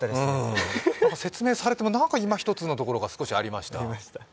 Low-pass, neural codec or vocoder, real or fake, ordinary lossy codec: none; none; real; none